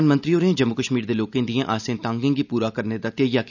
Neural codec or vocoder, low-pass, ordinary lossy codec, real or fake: none; 7.2 kHz; none; real